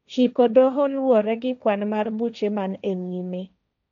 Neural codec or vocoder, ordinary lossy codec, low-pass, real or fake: codec, 16 kHz, 1.1 kbps, Voila-Tokenizer; none; 7.2 kHz; fake